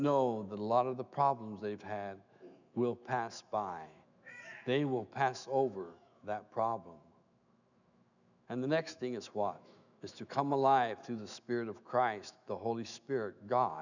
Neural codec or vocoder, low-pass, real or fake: autoencoder, 48 kHz, 128 numbers a frame, DAC-VAE, trained on Japanese speech; 7.2 kHz; fake